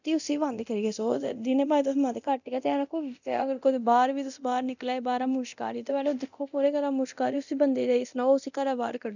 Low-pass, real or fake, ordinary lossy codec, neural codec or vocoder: 7.2 kHz; fake; none; codec, 24 kHz, 0.9 kbps, DualCodec